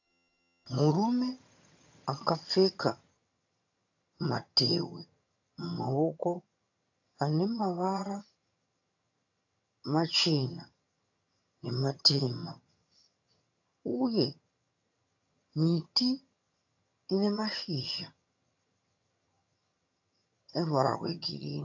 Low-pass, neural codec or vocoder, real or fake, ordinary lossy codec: 7.2 kHz; vocoder, 22.05 kHz, 80 mel bands, HiFi-GAN; fake; AAC, 48 kbps